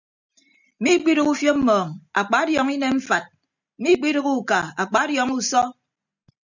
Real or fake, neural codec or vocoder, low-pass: real; none; 7.2 kHz